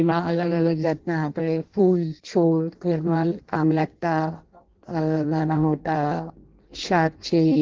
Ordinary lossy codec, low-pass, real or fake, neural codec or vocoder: Opus, 16 kbps; 7.2 kHz; fake; codec, 16 kHz in and 24 kHz out, 0.6 kbps, FireRedTTS-2 codec